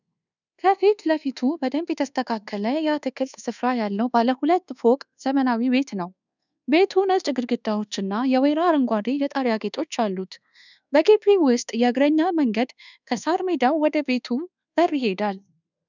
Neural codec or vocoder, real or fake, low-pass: codec, 24 kHz, 1.2 kbps, DualCodec; fake; 7.2 kHz